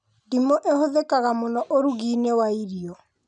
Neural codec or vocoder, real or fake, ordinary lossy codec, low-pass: none; real; none; 9.9 kHz